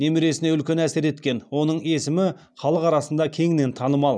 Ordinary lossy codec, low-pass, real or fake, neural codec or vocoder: none; none; real; none